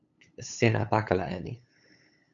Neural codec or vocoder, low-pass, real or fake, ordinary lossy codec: codec, 16 kHz, 8 kbps, FunCodec, trained on LibriTTS, 25 frames a second; 7.2 kHz; fake; MP3, 96 kbps